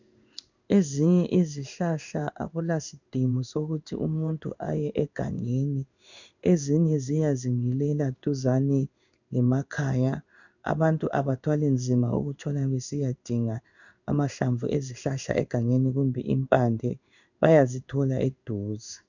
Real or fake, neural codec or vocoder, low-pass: fake; codec, 16 kHz in and 24 kHz out, 1 kbps, XY-Tokenizer; 7.2 kHz